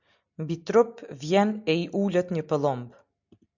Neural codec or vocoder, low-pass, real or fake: none; 7.2 kHz; real